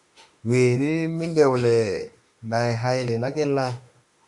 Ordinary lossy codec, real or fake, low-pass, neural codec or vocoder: Opus, 64 kbps; fake; 10.8 kHz; autoencoder, 48 kHz, 32 numbers a frame, DAC-VAE, trained on Japanese speech